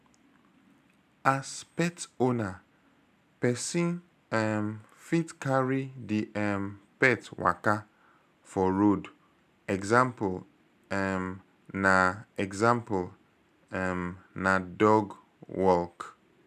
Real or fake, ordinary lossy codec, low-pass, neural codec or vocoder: real; none; 14.4 kHz; none